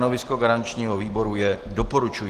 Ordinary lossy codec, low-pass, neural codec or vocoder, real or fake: Opus, 16 kbps; 14.4 kHz; none; real